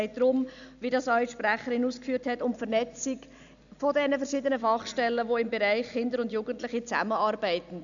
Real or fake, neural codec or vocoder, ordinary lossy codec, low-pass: real; none; none; 7.2 kHz